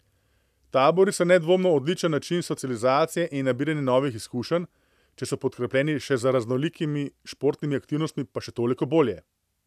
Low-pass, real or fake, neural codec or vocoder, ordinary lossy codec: 14.4 kHz; real; none; none